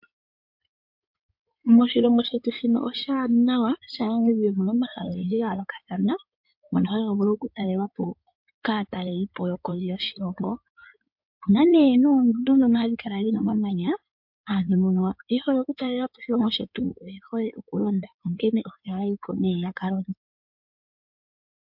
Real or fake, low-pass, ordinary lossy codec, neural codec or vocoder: fake; 5.4 kHz; MP3, 48 kbps; codec, 16 kHz in and 24 kHz out, 2.2 kbps, FireRedTTS-2 codec